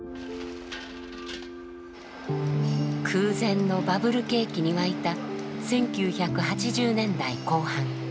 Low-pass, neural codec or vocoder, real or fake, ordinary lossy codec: none; none; real; none